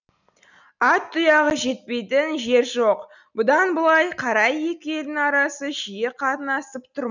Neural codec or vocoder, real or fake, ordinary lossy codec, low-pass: none; real; none; 7.2 kHz